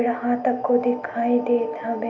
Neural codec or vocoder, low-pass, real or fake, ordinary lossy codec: none; 7.2 kHz; real; none